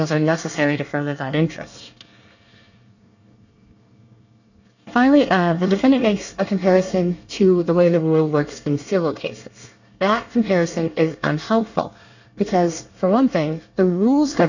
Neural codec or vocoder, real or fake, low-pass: codec, 24 kHz, 1 kbps, SNAC; fake; 7.2 kHz